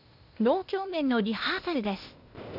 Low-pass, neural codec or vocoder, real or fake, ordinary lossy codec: 5.4 kHz; codec, 16 kHz, 0.8 kbps, ZipCodec; fake; none